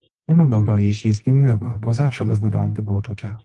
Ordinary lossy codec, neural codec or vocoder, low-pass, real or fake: Opus, 24 kbps; codec, 24 kHz, 0.9 kbps, WavTokenizer, medium music audio release; 10.8 kHz; fake